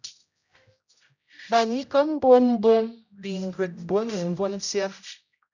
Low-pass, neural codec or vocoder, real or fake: 7.2 kHz; codec, 16 kHz, 0.5 kbps, X-Codec, HuBERT features, trained on general audio; fake